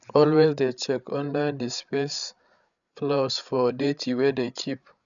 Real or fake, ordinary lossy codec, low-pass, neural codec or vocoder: fake; none; 7.2 kHz; codec, 16 kHz, 8 kbps, FreqCodec, larger model